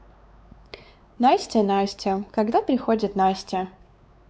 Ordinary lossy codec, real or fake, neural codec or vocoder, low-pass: none; fake; codec, 16 kHz, 4 kbps, X-Codec, WavLM features, trained on Multilingual LibriSpeech; none